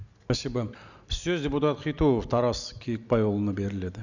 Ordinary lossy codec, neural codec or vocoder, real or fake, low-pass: none; none; real; 7.2 kHz